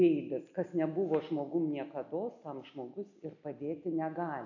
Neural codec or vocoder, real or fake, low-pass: none; real; 7.2 kHz